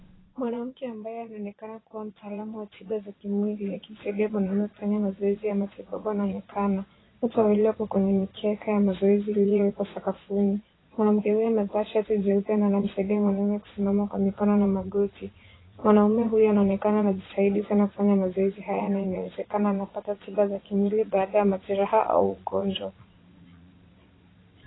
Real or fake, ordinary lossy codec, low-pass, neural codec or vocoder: fake; AAC, 16 kbps; 7.2 kHz; vocoder, 22.05 kHz, 80 mel bands, Vocos